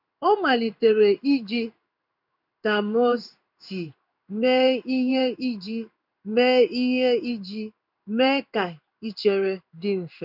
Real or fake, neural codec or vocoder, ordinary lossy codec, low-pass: fake; codec, 16 kHz in and 24 kHz out, 1 kbps, XY-Tokenizer; none; 5.4 kHz